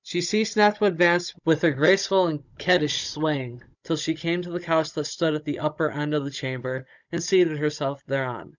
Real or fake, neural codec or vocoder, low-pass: fake; codec, 16 kHz, 16 kbps, FunCodec, trained on Chinese and English, 50 frames a second; 7.2 kHz